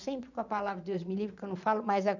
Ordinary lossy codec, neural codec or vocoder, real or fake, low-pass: none; vocoder, 22.05 kHz, 80 mel bands, Vocos; fake; 7.2 kHz